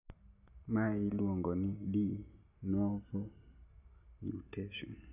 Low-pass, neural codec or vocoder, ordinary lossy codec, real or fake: 3.6 kHz; none; none; real